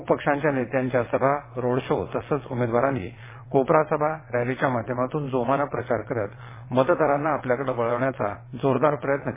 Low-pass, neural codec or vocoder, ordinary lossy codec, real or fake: 3.6 kHz; vocoder, 22.05 kHz, 80 mel bands, WaveNeXt; MP3, 16 kbps; fake